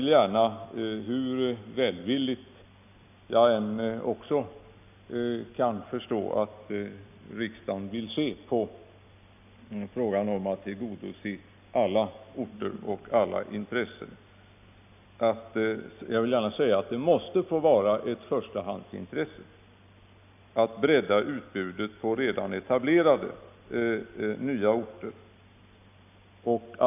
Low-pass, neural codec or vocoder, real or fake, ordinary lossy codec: 3.6 kHz; none; real; none